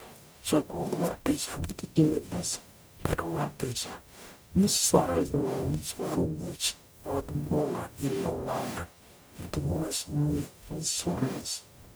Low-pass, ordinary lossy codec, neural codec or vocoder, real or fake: none; none; codec, 44.1 kHz, 0.9 kbps, DAC; fake